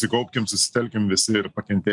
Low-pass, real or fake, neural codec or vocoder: 10.8 kHz; real; none